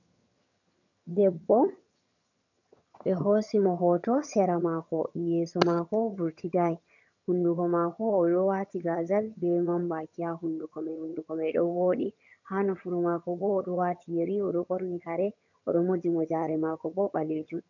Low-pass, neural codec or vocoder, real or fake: 7.2 kHz; vocoder, 22.05 kHz, 80 mel bands, HiFi-GAN; fake